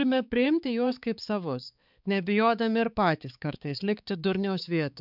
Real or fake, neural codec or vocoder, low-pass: fake; codec, 16 kHz, 4 kbps, X-Codec, HuBERT features, trained on balanced general audio; 5.4 kHz